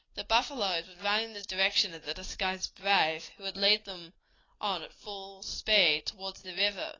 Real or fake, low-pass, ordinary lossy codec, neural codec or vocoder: real; 7.2 kHz; AAC, 32 kbps; none